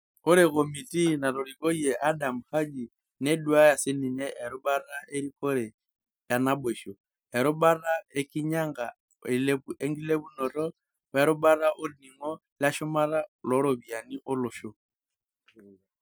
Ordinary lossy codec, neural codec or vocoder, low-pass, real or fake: none; none; none; real